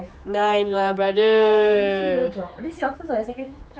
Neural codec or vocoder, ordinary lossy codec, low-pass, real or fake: codec, 16 kHz, 4 kbps, X-Codec, HuBERT features, trained on general audio; none; none; fake